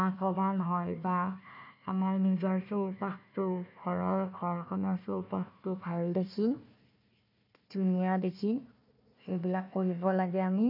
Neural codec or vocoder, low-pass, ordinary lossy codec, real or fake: codec, 16 kHz, 1 kbps, FunCodec, trained on Chinese and English, 50 frames a second; 5.4 kHz; none; fake